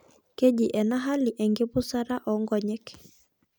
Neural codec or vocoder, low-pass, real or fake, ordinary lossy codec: none; none; real; none